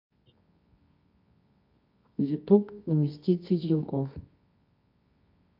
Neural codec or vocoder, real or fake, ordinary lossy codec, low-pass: codec, 24 kHz, 0.9 kbps, WavTokenizer, medium music audio release; fake; MP3, 48 kbps; 5.4 kHz